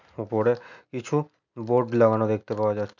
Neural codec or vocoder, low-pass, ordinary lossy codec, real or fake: none; 7.2 kHz; none; real